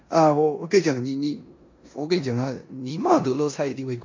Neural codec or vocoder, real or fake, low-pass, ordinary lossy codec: codec, 16 kHz in and 24 kHz out, 0.9 kbps, LongCat-Audio-Codec, four codebook decoder; fake; 7.2 kHz; MP3, 48 kbps